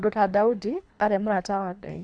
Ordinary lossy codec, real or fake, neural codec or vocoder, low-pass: none; fake; codec, 24 kHz, 3 kbps, HILCodec; 9.9 kHz